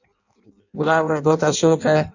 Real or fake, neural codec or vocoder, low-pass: fake; codec, 16 kHz in and 24 kHz out, 0.6 kbps, FireRedTTS-2 codec; 7.2 kHz